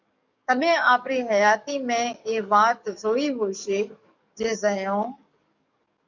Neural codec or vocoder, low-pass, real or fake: codec, 44.1 kHz, 7.8 kbps, DAC; 7.2 kHz; fake